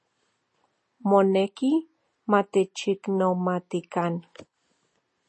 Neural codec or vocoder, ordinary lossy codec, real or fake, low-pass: none; MP3, 32 kbps; real; 10.8 kHz